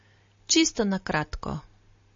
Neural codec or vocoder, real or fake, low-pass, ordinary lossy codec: none; real; 7.2 kHz; MP3, 32 kbps